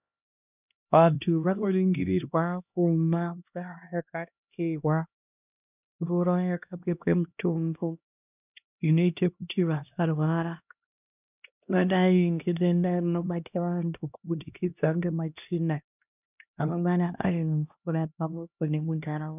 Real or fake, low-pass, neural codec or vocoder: fake; 3.6 kHz; codec, 16 kHz, 1 kbps, X-Codec, HuBERT features, trained on LibriSpeech